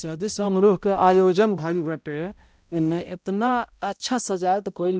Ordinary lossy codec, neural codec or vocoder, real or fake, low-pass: none; codec, 16 kHz, 0.5 kbps, X-Codec, HuBERT features, trained on balanced general audio; fake; none